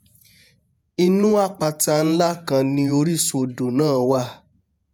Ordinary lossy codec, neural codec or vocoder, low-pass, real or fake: none; vocoder, 48 kHz, 128 mel bands, Vocos; none; fake